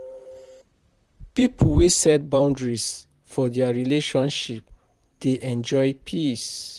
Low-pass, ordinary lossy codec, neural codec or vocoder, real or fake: 14.4 kHz; Opus, 32 kbps; vocoder, 44.1 kHz, 128 mel bands, Pupu-Vocoder; fake